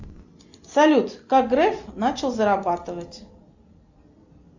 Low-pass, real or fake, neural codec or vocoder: 7.2 kHz; real; none